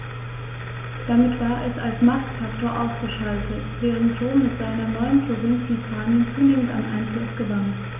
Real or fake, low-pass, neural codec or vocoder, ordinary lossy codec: real; 3.6 kHz; none; none